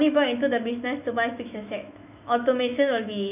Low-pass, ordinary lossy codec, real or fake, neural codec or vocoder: 3.6 kHz; none; fake; autoencoder, 48 kHz, 128 numbers a frame, DAC-VAE, trained on Japanese speech